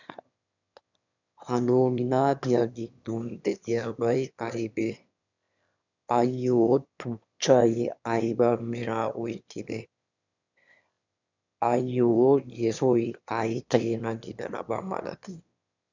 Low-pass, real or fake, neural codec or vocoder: 7.2 kHz; fake; autoencoder, 22.05 kHz, a latent of 192 numbers a frame, VITS, trained on one speaker